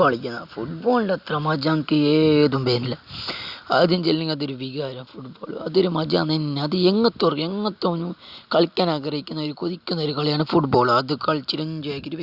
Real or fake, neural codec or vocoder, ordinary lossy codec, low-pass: real; none; Opus, 64 kbps; 5.4 kHz